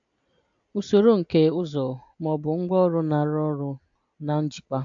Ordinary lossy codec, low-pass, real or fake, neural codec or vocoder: AAC, 64 kbps; 7.2 kHz; real; none